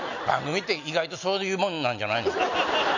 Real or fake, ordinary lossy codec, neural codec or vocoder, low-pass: real; none; none; 7.2 kHz